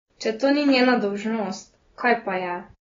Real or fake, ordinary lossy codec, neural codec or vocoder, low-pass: real; AAC, 24 kbps; none; 7.2 kHz